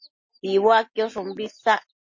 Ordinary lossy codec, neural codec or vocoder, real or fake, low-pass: MP3, 32 kbps; none; real; 7.2 kHz